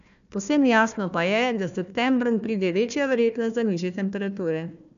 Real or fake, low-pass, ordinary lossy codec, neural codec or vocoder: fake; 7.2 kHz; none; codec, 16 kHz, 1 kbps, FunCodec, trained on Chinese and English, 50 frames a second